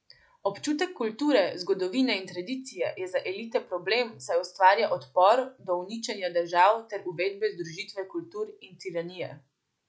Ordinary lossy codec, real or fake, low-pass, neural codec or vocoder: none; real; none; none